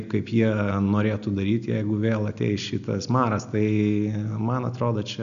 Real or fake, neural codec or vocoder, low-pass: real; none; 7.2 kHz